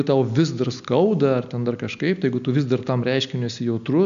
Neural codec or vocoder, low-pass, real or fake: none; 7.2 kHz; real